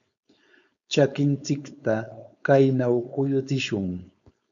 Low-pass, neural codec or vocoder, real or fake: 7.2 kHz; codec, 16 kHz, 4.8 kbps, FACodec; fake